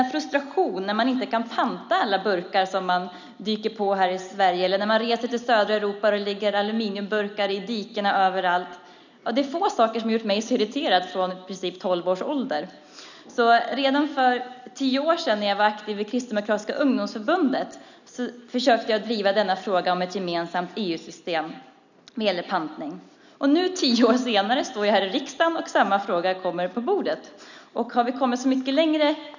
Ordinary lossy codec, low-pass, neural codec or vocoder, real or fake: none; 7.2 kHz; none; real